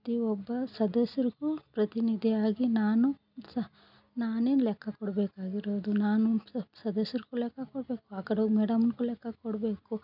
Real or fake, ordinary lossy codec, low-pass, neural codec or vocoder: real; none; 5.4 kHz; none